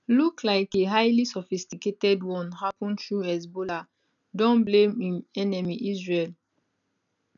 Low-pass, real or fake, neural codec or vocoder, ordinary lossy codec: 7.2 kHz; real; none; none